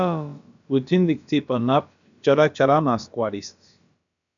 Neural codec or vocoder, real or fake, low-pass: codec, 16 kHz, about 1 kbps, DyCAST, with the encoder's durations; fake; 7.2 kHz